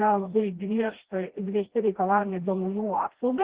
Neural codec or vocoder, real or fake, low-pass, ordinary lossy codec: codec, 16 kHz, 1 kbps, FreqCodec, smaller model; fake; 3.6 kHz; Opus, 16 kbps